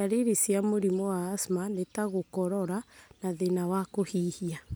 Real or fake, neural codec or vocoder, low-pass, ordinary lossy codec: real; none; none; none